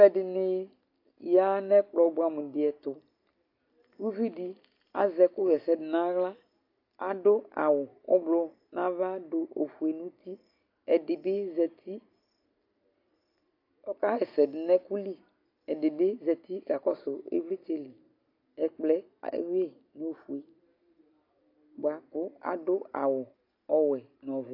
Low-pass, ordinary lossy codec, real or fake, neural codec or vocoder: 5.4 kHz; AAC, 32 kbps; real; none